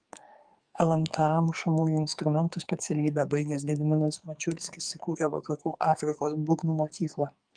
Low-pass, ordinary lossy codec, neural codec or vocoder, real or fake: 9.9 kHz; Opus, 32 kbps; codec, 44.1 kHz, 2.6 kbps, SNAC; fake